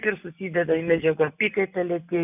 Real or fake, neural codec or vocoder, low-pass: real; none; 3.6 kHz